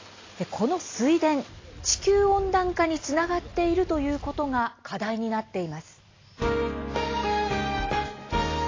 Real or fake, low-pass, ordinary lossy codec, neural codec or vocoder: real; 7.2 kHz; AAC, 32 kbps; none